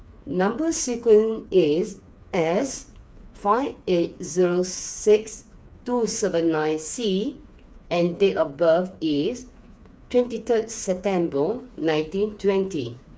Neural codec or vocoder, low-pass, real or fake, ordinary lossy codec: codec, 16 kHz, 4 kbps, FreqCodec, smaller model; none; fake; none